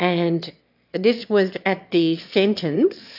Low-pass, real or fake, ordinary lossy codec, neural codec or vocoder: 5.4 kHz; fake; AAC, 48 kbps; autoencoder, 22.05 kHz, a latent of 192 numbers a frame, VITS, trained on one speaker